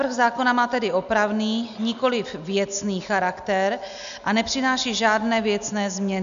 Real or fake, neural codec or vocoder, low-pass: real; none; 7.2 kHz